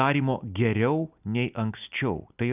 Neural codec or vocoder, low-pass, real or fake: none; 3.6 kHz; real